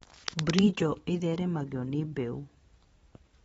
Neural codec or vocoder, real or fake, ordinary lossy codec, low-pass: vocoder, 44.1 kHz, 128 mel bands every 512 samples, BigVGAN v2; fake; AAC, 24 kbps; 19.8 kHz